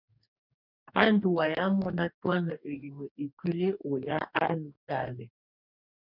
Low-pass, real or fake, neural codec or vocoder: 5.4 kHz; fake; codec, 44.1 kHz, 2.6 kbps, DAC